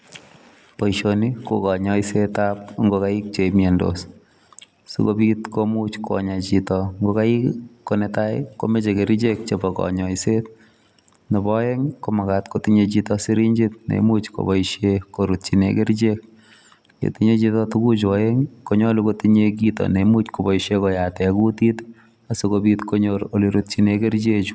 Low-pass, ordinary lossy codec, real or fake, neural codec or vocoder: none; none; real; none